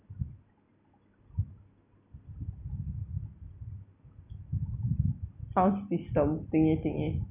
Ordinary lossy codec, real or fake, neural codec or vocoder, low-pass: none; real; none; 3.6 kHz